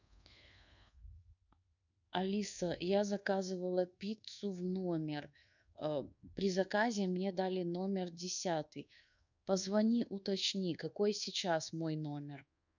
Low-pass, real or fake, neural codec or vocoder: 7.2 kHz; fake; codec, 24 kHz, 1.2 kbps, DualCodec